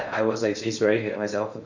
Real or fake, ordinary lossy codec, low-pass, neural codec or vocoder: fake; MP3, 48 kbps; 7.2 kHz; codec, 16 kHz in and 24 kHz out, 0.6 kbps, FocalCodec, streaming, 2048 codes